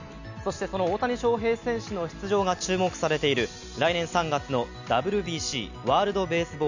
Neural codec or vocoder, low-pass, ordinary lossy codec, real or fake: none; 7.2 kHz; none; real